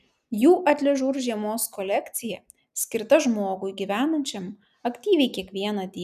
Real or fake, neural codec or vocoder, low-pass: real; none; 14.4 kHz